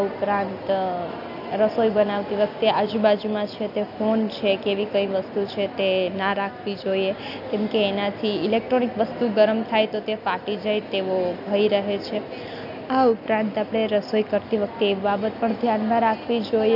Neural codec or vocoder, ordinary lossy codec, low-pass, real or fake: none; none; 5.4 kHz; real